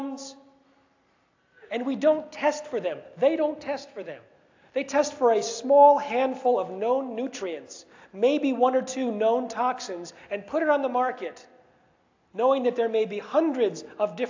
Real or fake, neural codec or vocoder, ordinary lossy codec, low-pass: real; none; AAC, 48 kbps; 7.2 kHz